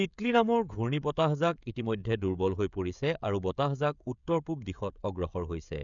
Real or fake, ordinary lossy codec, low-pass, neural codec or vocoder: fake; none; 7.2 kHz; codec, 16 kHz, 16 kbps, FreqCodec, smaller model